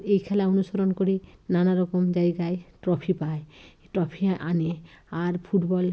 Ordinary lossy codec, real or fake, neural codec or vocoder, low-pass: none; real; none; none